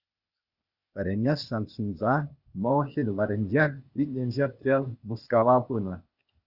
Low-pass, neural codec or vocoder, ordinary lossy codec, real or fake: 5.4 kHz; codec, 16 kHz, 0.8 kbps, ZipCodec; Opus, 64 kbps; fake